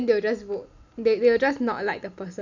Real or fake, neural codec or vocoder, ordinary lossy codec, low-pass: real; none; none; 7.2 kHz